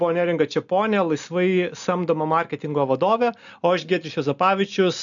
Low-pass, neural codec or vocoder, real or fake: 7.2 kHz; none; real